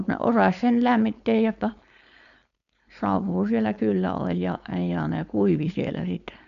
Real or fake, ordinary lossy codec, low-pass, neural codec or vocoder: fake; none; 7.2 kHz; codec, 16 kHz, 4.8 kbps, FACodec